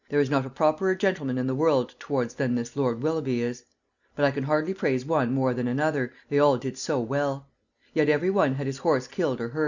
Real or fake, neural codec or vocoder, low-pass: real; none; 7.2 kHz